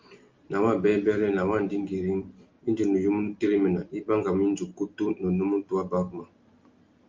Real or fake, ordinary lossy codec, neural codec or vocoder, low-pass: real; Opus, 32 kbps; none; 7.2 kHz